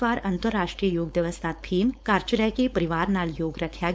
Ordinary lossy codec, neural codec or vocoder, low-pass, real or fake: none; codec, 16 kHz, 4.8 kbps, FACodec; none; fake